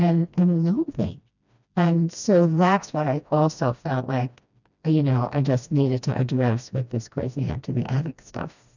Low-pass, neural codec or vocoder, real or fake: 7.2 kHz; codec, 16 kHz, 1 kbps, FreqCodec, smaller model; fake